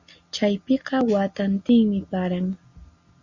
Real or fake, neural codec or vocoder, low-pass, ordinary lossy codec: real; none; 7.2 kHz; Opus, 64 kbps